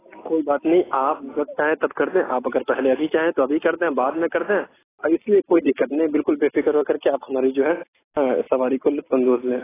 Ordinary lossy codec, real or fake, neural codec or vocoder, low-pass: AAC, 16 kbps; real; none; 3.6 kHz